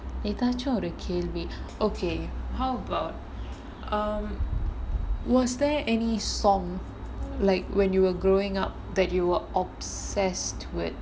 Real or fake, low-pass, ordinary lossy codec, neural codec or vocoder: real; none; none; none